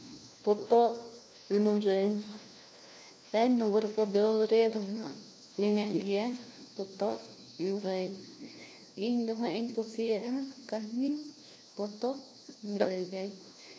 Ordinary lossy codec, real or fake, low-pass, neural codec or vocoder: none; fake; none; codec, 16 kHz, 1 kbps, FunCodec, trained on LibriTTS, 50 frames a second